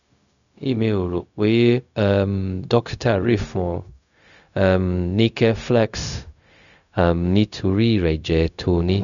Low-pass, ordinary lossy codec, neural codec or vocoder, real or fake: 7.2 kHz; none; codec, 16 kHz, 0.4 kbps, LongCat-Audio-Codec; fake